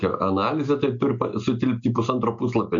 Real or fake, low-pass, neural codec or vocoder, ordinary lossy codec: real; 7.2 kHz; none; MP3, 96 kbps